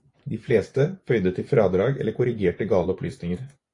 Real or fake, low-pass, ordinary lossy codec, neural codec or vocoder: fake; 10.8 kHz; AAC, 48 kbps; vocoder, 24 kHz, 100 mel bands, Vocos